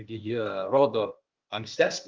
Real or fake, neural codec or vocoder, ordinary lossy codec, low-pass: fake; codec, 16 kHz, 0.8 kbps, ZipCodec; Opus, 24 kbps; 7.2 kHz